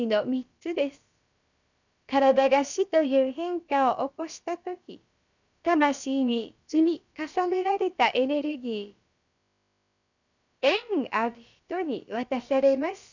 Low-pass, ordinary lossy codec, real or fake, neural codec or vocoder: 7.2 kHz; none; fake; codec, 16 kHz, about 1 kbps, DyCAST, with the encoder's durations